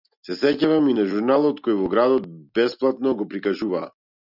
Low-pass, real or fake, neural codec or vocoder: 5.4 kHz; real; none